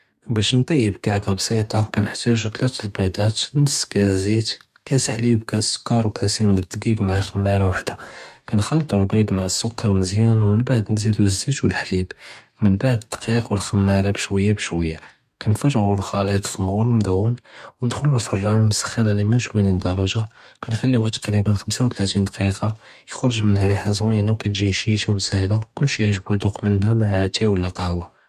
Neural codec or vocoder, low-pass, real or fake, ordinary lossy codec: codec, 44.1 kHz, 2.6 kbps, DAC; 14.4 kHz; fake; MP3, 96 kbps